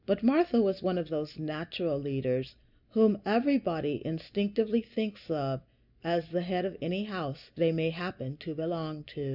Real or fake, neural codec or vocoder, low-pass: real; none; 5.4 kHz